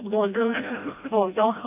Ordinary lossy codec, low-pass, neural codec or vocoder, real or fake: none; 3.6 kHz; codec, 16 kHz, 1 kbps, FreqCodec, smaller model; fake